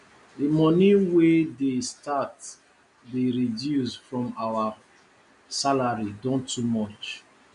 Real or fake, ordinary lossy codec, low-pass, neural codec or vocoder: real; none; 10.8 kHz; none